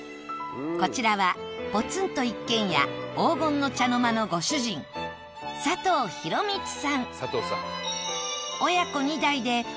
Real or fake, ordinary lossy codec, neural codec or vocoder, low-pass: real; none; none; none